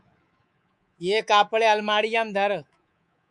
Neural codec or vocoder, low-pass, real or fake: codec, 24 kHz, 3.1 kbps, DualCodec; 10.8 kHz; fake